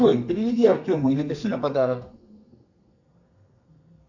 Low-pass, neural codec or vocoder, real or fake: 7.2 kHz; codec, 32 kHz, 1.9 kbps, SNAC; fake